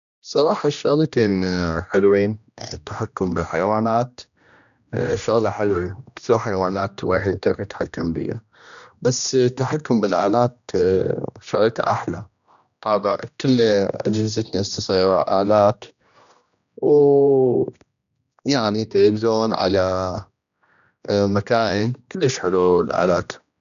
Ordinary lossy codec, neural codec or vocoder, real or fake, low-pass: none; codec, 16 kHz, 1 kbps, X-Codec, HuBERT features, trained on general audio; fake; 7.2 kHz